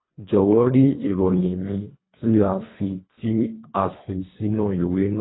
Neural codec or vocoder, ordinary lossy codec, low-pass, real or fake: codec, 24 kHz, 1.5 kbps, HILCodec; AAC, 16 kbps; 7.2 kHz; fake